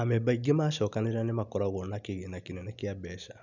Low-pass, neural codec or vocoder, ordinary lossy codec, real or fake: 7.2 kHz; none; none; real